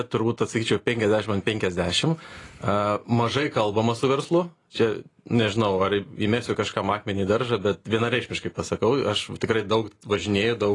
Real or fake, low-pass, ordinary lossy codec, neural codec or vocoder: real; 10.8 kHz; AAC, 32 kbps; none